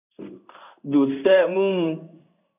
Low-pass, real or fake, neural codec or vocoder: 3.6 kHz; fake; codec, 16 kHz in and 24 kHz out, 1 kbps, XY-Tokenizer